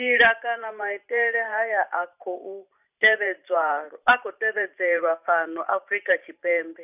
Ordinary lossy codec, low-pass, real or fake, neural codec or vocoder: none; 3.6 kHz; fake; vocoder, 44.1 kHz, 128 mel bands every 512 samples, BigVGAN v2